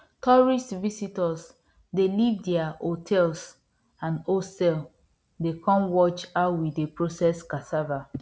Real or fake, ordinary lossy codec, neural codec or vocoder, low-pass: real; none; none; none